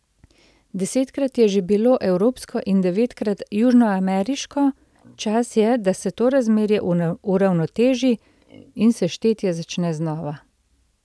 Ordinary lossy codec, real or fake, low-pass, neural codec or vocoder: none; real; none; none